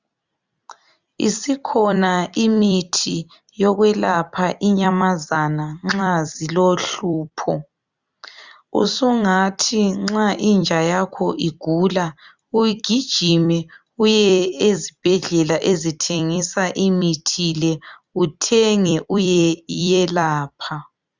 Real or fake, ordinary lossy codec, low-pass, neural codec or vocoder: fake; Opus, 64 kbps; 7.2 kHz; vocoder, 44.1 kHz, 128 mel bands every 256 samples, BigVGAN v2